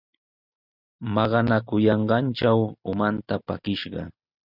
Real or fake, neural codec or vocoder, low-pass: real; none; 5.4 kHz